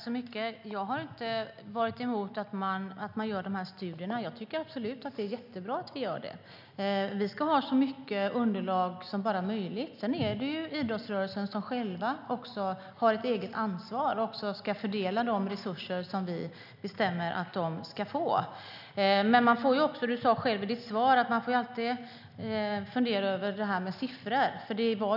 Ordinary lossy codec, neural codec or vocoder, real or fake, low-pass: none; none; real; 5.4 kHz